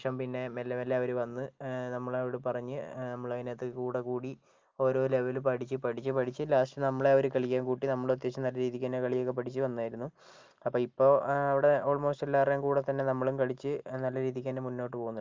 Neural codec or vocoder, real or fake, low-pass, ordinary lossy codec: none; real; 7.2 kHz; Opus, 32 kbps